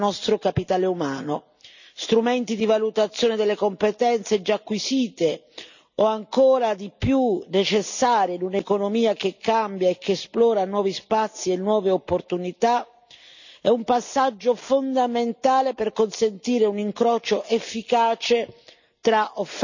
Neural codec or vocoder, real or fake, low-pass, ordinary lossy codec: none; real; 7.2 kHz; none